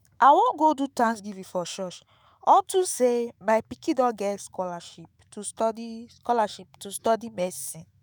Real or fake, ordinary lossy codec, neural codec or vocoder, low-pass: fake; none; autoencoder, 48 kHz, 128 numbers a frame, DAC-VAE, trained on Japanese speech; none